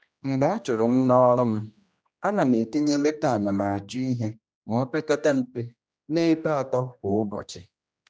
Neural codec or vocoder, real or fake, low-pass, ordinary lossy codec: codec, 16 kHz, 1 kbps, X-Codec, HuBERT features, trained on general audio; fake; none; none